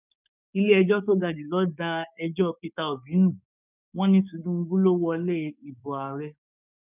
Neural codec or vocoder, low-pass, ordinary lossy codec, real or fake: codec, 16 kHz, 6 kbps, DAC; 3.6 kHz; none; fake